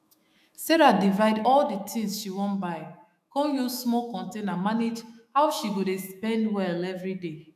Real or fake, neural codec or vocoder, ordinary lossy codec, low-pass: fake; autoencoder, 48 kHz, 128 numbers a frame, DAC-VAE, trained on Japanese speech; none; 14.4 kHz